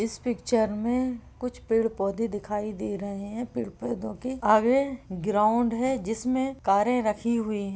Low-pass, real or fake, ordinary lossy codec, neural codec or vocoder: none; real; none; none